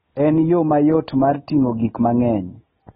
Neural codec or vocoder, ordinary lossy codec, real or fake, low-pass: none; AAC, 16 kbps; real; 19.8 kHz